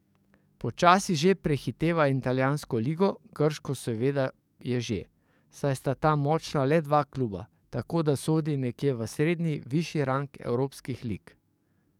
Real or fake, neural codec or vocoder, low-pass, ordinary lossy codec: fake; codec, 44.1 kHz, 7.8 kbps, DAC; 19.8 kHz; none